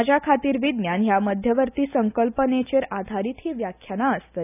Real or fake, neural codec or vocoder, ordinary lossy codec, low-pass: real; none; none; 3.6 kHz